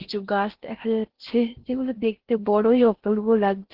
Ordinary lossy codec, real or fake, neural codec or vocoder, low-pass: Opus, 16 kbps; fake; codec, 16 kHz in and 24 kHz out, 0.8 kbps, FocalCodec, streaming, 65536 codes; 5.4 kHz